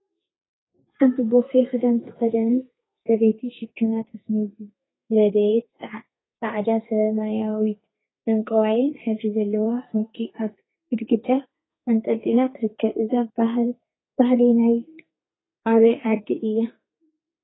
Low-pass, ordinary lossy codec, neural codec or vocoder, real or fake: 7.2 kHz; AAC, 16 kbps; codec, 32 kHz, 1.9 kbps, SNAC; fake